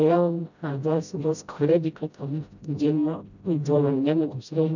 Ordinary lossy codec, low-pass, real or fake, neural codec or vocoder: none; 7.2 kHz; fake; codec, 16 kHz, 0.5 kbps, FreqCodec, smaller model